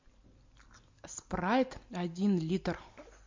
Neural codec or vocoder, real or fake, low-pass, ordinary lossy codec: none; real; 7.2 kHz; MP3, 48 kbps